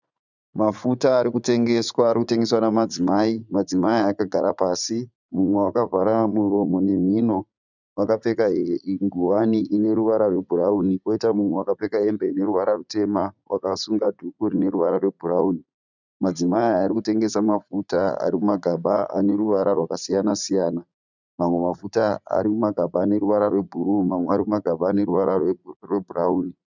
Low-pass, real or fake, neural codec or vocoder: 7.2 kHz; fake; vocoder, 44.1 kHz, 80 mel bands, Vocos